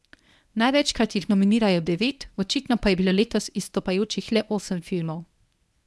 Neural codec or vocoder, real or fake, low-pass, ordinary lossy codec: codec, 24 kHz, 0.9 kbps, WavTokenizer, medium speech release version 1; fake; none; none